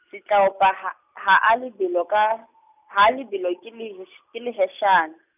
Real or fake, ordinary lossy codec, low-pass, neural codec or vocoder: real; none; 3.6 kHz; none